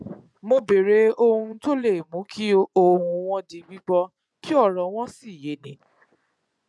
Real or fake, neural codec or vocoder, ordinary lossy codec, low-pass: fake; vocoder, 24 kHz, 100 mel bands, Vocos; none; none